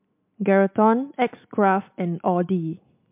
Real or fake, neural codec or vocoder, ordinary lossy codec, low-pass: real; none; MP3, 32 kbps; 3.6 kHz